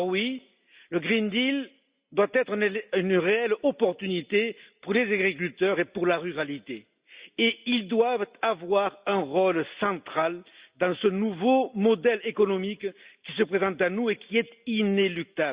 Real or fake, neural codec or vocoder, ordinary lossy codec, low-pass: real; none; Opus, 64 kbps; 3.6 kHz